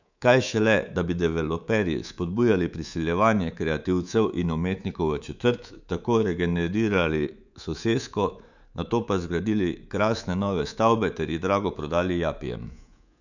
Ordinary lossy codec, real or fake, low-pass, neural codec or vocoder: none; fake; 7.2 kHz; codec, 24 kHz, 3.1 kbps, DualCodec